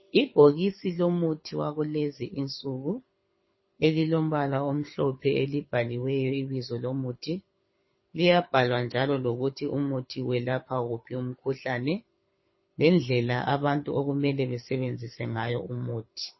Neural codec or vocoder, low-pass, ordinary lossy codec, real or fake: codec, 24 kHz, 6 kbps, HILCodec; 7.2 kHz; MP3, 24 kbps; fake